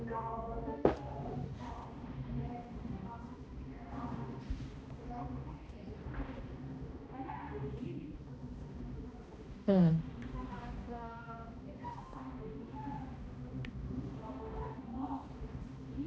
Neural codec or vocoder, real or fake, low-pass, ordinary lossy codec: codec, 16 kHz, 1 kbps, X-Codec, HuBERT features, trained on balanced general audio; fake; none; none